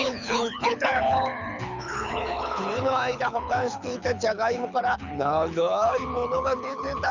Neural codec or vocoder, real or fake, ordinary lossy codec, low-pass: codec, 24 kHz, 6 kbps, HILCodec; fake; none; 7.2 kHz